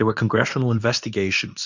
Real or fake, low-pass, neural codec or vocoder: fake; 7.2 kHz; codec, 24 kHz, 0.9 kbps, WavTokenizer, medium speech release version 2